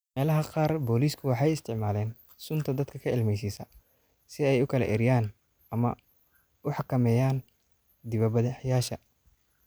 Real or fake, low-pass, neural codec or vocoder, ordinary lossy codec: real; none; none; none